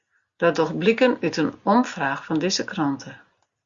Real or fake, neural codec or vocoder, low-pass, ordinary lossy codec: real; none; 7.2 kHz; Opus, 64 kbps